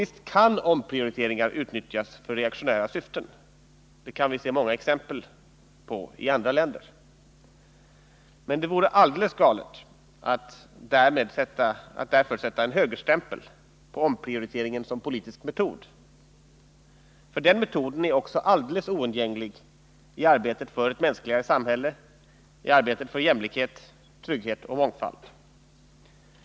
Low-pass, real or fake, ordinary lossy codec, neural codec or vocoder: none; real; none; none